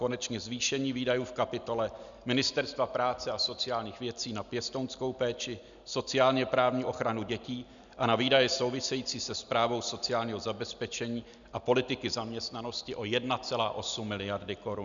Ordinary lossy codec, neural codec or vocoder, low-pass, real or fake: MP3, 96 kbps; none; 7.2 kHz; real